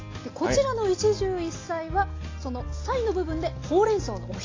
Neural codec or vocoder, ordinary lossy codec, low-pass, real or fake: none; AAC, 32 kbps; 7.2 kHz; real